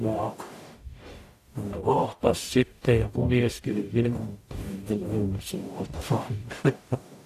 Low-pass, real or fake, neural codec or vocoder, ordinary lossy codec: 14.4 kHz; fake; codec, 44.1 kHz, 0.9 kbps, DAC; AAC, 64 kbps